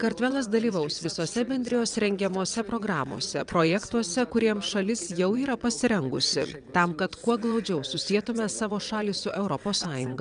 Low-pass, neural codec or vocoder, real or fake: 9.9 kHz; vocoder, 22.05 kHz, 80 mel bands, WaveNeXt; fake